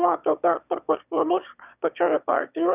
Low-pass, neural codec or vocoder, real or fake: 3.6 kHz; autoencoder, 22.05 kHz, a latent of 192 numbers a frame, VITS, trained on one speaker; fake